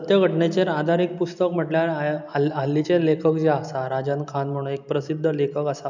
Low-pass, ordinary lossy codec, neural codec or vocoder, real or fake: 7.2 kHz; none; none; real